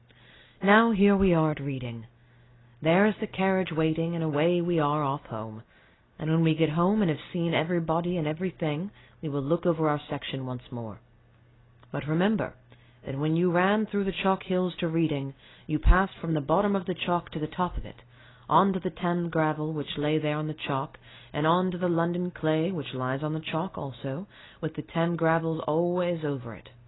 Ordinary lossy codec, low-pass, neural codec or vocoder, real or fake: AAC, 16 kbps; 7.2 kHz; none; real